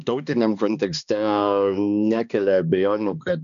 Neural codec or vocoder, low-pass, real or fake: codec, 16 kHz, 2 kbps, X-Codec, HuBERT features, trained on balanced general audio; 7.2 kHz; fake